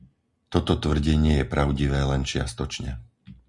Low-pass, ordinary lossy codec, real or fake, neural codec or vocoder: 10.8 kHz; Opus, 64 kbps; real; none